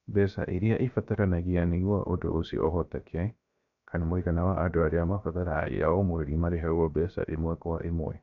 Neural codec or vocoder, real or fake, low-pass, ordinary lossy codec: codec, 16 kHz, 0.7 kbps, FocalCodec; fake; 7.2 kHz; none